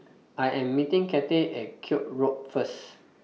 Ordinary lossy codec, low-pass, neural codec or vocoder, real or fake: none; none; none; real